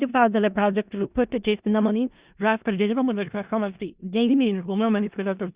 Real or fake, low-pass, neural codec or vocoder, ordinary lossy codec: fake; 3.6 kHz; codec, 16 kHz in and 24 kHz out, 0.4 kbps, LongCat-Audio-Codec, four codebook decoder; Opus, 64 kbps